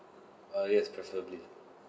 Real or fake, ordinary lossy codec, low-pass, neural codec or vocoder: real; none; none; none